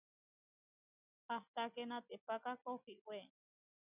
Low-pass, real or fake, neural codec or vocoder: 3.6 kHz; real; none